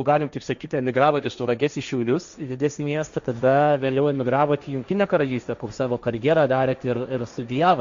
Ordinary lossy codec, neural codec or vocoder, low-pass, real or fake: Opus, 64 kbps; codec, 16 kHz, 1.1 kbps, Voila-Tokenizer; 7.2 kHz; fake